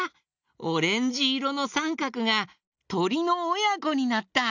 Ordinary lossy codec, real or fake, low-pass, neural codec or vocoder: none; real; 7.2 kHz; none